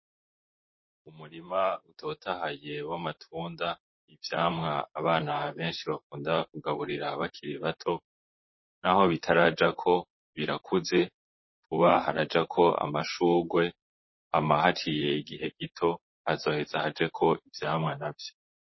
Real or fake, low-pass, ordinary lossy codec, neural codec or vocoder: fake; 7.2 kHz; MP3, 24 kbps; vocoder, 44.1 kHz, 128 mel bands, Pupu-Vocoder